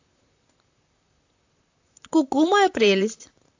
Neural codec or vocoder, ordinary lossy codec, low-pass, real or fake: vocoder, 44.1 kHz, 128 mel bands, Pupu-Vocoder; AAC, 48 kbps; 7.2 kHz; fake